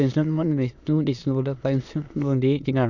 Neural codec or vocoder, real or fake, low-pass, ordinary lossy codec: autoencoder, 22.05 kHz, a latent of 192 numbers a frame, VITS, trained on many speakers; fake; 7.2 kHz; none